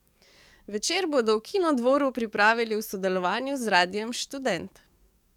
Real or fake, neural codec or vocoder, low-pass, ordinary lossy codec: fake; codec, 44.1 kHz, 7.8 kbps, DAC; 19.8 kHz; none